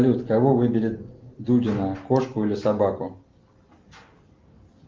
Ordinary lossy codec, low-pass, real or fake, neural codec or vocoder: Opus, 32 kbps; 7.2 kHz; real; none